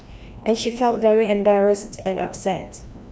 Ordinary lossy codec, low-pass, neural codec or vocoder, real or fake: none; none; codec, 16 kHz, 1 kbps, FreqCodec, larger model; fake